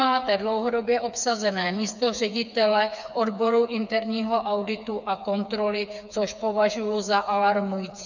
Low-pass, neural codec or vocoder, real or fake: 7.2 kHz; codec, 16 kHz, 4 kbps, FreqCodec, smaller model; fake